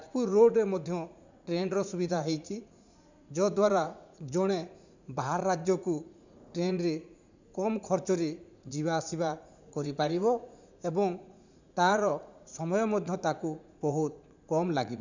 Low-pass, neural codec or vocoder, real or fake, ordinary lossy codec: 7.2 kHz; vocoder, 44.1 kHz, 80 mel bands, Vocos; fake; none